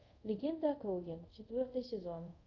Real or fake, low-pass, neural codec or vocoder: fake; 7.2 kHz; codec, 24 kHz, 0.5 kbps, DualCodec